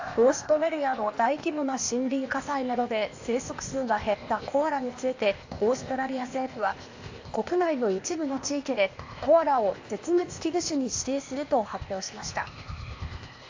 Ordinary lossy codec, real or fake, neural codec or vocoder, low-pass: AAC, 48 kbps; fake; codec, 16 kHz, 0.8 kbps, ZipCodec; 7.2 kHz